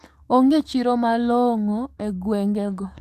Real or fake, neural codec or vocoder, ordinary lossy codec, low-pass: fake; codec, 44.1 kHz, 7.8 kbps, DAC; none; 14.4 kHz